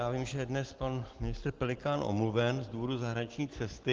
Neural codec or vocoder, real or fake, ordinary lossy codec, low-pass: none; real; Opus, 16 kbps; 7.2 kHz